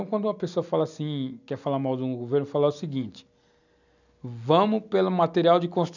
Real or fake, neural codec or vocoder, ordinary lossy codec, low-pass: real; none; none; 7.2 kHz